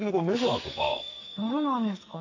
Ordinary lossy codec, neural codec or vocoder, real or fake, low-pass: AAC, 48 kbps; codec, 44.1 kHz, 2.6 kbps, SNAC; fake; 7.2 kHz